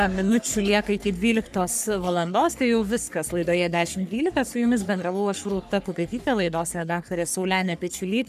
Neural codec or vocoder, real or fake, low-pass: codec, 44.1 kHz, 3.4 kbps, Pupu-Codec; fake; 14.4 kHz